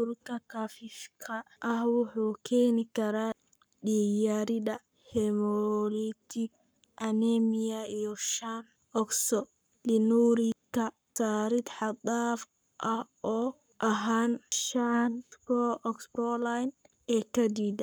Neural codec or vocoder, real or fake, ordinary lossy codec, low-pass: codec, 44.1 kHz, 7.8 kbps, Pupu-Codec; fake; none; none